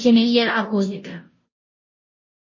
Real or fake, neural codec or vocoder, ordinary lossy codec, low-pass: fake; codec, 16 kHz, 0.5 kbps, FunCodec, trained on Chinese and English, 25 frames a second; MP3, 32 kbps; 7.2 kHz